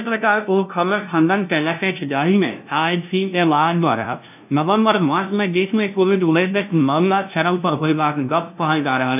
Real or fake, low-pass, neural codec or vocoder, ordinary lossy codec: fake; 3.6 kHz; codec, 16 kHz, 0.5 kbps, FunCodec, trained on LibriTTS, 25 frames a second; none